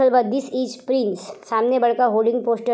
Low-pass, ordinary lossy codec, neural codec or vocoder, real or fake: none; none; none; real